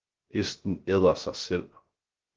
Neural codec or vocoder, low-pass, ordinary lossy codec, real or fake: codec, 16 kHz, 0.3 kbps, FocalCodec; 7.2 kHz; Opus, 16 kbps; fake